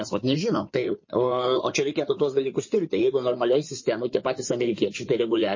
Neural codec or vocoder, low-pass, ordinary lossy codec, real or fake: codec, 16 kHz in and 24 kHz out, 2.2 kbps, FireRedTTS-2 codec; 7.2 kHz; MP3, 32 kbps; fake